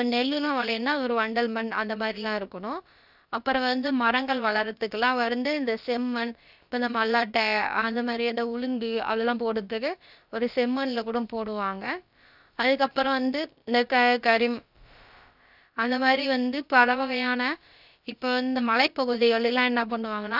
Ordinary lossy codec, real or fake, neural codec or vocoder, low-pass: none; fake; codec, 16 kHz, about 1 kbps, DyCAST, with the encoder's durations; 5.4 kHz